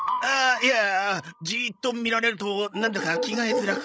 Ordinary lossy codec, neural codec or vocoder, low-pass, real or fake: none; codec, 16 kHz, 16 kbps, FreqCodec, larger model; none; fake